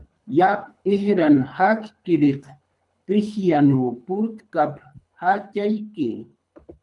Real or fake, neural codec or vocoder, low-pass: fake; codec, 24 kHz, 3 kbps, HILCodec; 10.8 kHz